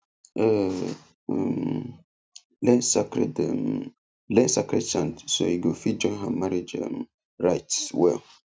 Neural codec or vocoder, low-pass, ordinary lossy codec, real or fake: none; none; none; real